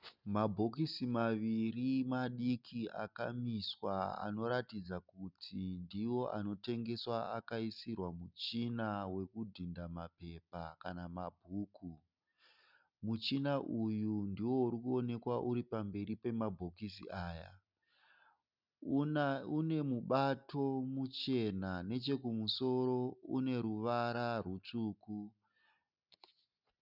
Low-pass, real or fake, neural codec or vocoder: 5.4 kHz; real; none